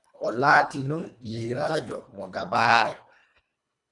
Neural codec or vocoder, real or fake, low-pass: codec, 24 kHz, 1.5 kbps, HILCodec; fake; 10.8 kHz